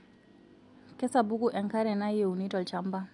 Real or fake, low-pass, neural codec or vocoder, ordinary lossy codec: real; 10.8 kHz; none; none